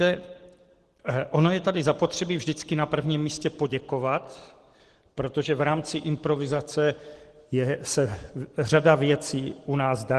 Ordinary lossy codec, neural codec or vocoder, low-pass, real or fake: Opus, 16 kbps; none; 10.8 kHz; real